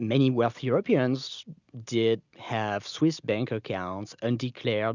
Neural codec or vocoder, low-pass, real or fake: none; 7.2 kHz; real